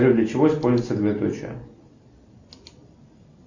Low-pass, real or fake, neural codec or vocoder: 7.2 kHz; real; none